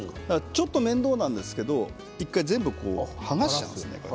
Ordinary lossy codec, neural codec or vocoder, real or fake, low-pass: none; none; real; none